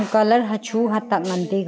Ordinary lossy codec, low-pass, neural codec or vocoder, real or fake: none; none; none; real